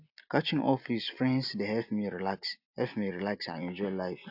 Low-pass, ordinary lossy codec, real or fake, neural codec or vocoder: 5.4 kHz; AAC, 48 kbps; real; none